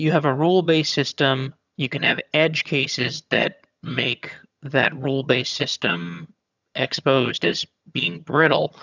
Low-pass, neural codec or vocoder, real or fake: 7.2 kHz; vocoder, 22.05 kHz, 80 mel bands, HiFi-GAN; fake